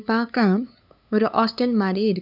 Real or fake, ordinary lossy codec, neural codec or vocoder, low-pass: fake; none; codec, 16 kHz, 2 kbps, FunCodec, trained on LibriTTS, 25 frames a second; 5.4 kHz